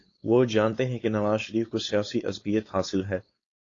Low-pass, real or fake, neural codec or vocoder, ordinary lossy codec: 7.2 kHz; fake; codec, 16 kHz, 4.8 kbps, FACodec; AAC, 32 kbps